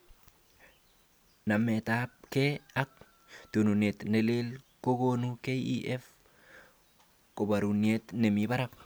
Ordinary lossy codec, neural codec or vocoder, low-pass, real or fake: none; none; none; real